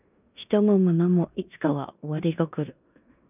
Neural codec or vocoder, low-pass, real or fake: codec, 16 kHz in and 24 kHz out, 0.9 kbps, LongCat-Audio-Codec, four codebook decoder; 3.6 kHz; fake